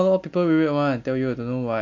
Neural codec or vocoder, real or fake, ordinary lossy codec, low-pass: none; real; MP3, 48 kbps; 7.2 kHz